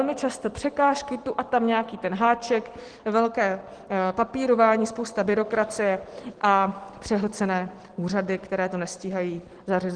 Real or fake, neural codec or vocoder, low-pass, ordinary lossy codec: real; none; 9.9 kHz; Opus, 16 kbps